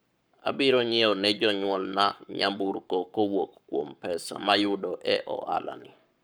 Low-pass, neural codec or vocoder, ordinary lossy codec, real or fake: none; codec, 44.1 kHz, 7.8 kbps, Pupu-Codec; none; fake